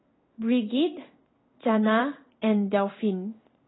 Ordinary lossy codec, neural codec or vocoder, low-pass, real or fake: AAC, 16 kbps; codec, 16 kHz in and 24 kHz out, 1 kbps, XY-Tokenizer; 7.2 kHz; fake